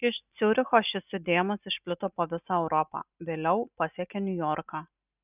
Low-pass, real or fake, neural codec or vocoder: 3.6 kHz; real; none